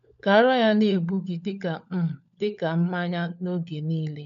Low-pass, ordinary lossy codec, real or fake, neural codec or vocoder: 7.2 kHz; none; fake; codec, 16 kHz, 16 kbps, FunCodec, trained on LibriTTS, 50 frames a second